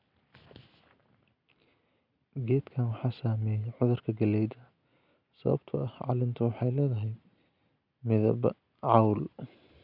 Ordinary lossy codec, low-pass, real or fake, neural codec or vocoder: none; 5.4 kHz; real; none